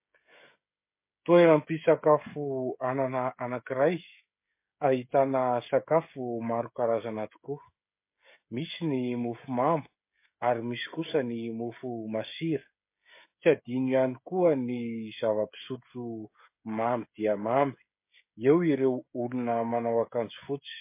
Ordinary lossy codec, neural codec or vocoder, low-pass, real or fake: MP3, 24 kbps; codec, 16 kHz, 16 kbps, FreqCodec, smaller model; 3.6 kHz; fake